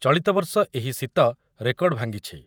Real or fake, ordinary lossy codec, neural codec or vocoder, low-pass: fake; none; vocoder, 48 kHz, 128 mel bands, Vocos; none